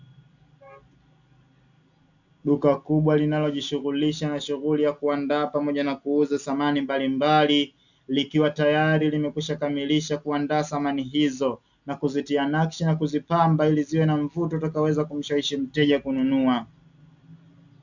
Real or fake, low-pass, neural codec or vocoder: real; 7.2 kHz; none